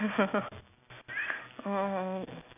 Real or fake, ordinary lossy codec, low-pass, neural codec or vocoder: real; none; 3.6 kHz; none